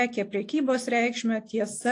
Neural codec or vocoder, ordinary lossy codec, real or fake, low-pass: none; AAC, 48 kbps; real; 10.8 kHz